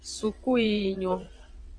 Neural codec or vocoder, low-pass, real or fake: vocoder, 22.05 kHz, 80 mel bands, WaveNeXt; 9.9 kHz; fake